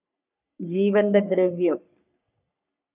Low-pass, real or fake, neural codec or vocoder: 3.6 kHz; fake; codec, 44.1 kHz, 3.4 kbps, Pupu-Codec